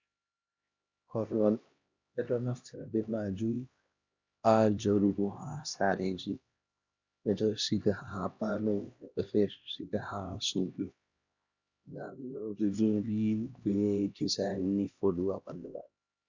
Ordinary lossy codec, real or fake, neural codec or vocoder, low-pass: Opus, 64 kbps; fake; codec, 16 kHz, 1 kbps, X-Codec, HuBERT features, trained on LibriSpeech; 7.2 kHz